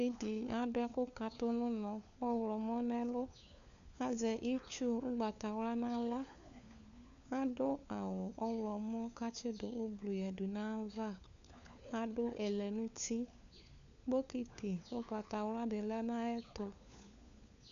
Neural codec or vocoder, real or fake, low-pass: codec, 16 kHz, 4 kbps, FunCodec, trained on LibriTTS, 50 frames a second; fake; 7.2 kHz